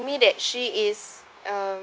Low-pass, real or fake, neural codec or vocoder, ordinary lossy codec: none; fake; codec, 16 kHz, 0.9 kbps, LongCat-Audio-Codec; none